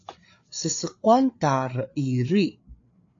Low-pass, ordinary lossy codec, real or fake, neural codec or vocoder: 7.2 kHz; AAC, 48 kbps; fake; codec, 16 kHz, 4 kbps, FreqCodec, larger model